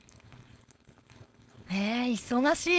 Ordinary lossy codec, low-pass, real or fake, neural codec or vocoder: none; none; fake; codec, 16 kHz, 4.8 kbps, FACodec